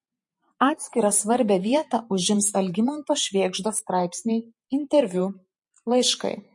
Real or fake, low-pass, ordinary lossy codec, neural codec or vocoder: fake; 10.8 kHz; MP3, 48 kbps; vocoder, 48 kHz, 128 mel bands, Vocos